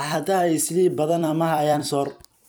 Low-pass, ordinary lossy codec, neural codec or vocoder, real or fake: none; none; vocoder, 44.1 kHz, 128 mel bands every 256 samples, BigVGAN v2; fake